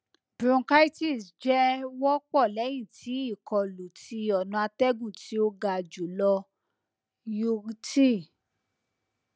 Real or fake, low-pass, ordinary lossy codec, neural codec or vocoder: real; none; none; none